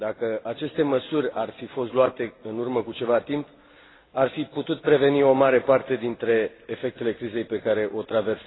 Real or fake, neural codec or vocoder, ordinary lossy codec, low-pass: real; none; AAC, 16 kbps; 7.2 kHz